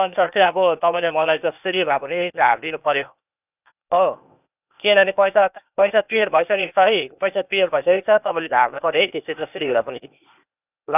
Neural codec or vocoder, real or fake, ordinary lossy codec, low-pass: codec, 16 kHz, 0.8 kbps, ZipCodec; fake; none; 3.6 kHz